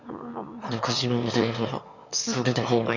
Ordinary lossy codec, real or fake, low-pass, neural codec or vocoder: none; fake; 7.2 kHz; autoencoder, 22.05 kHz, a latent of 192 numbers a frame, VITS, trained on one speaker